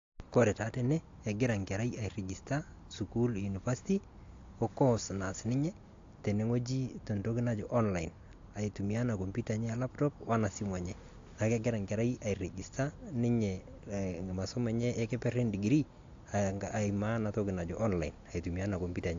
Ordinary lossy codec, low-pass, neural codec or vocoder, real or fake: AAC, 48 kbps; 7.2 kHz; none; real